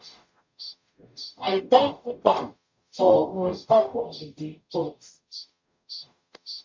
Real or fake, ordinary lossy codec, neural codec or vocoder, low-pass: fake; MP3, 64 kbps; codec, 44.1 kHz, 0.9 kbps, DAC; 7.2 kHz